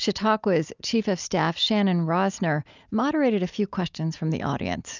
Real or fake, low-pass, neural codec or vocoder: real; 7.2 kHz; none